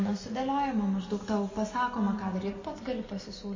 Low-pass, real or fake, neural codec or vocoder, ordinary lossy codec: 7.2 kHz; real; none; MP3, 32 kbps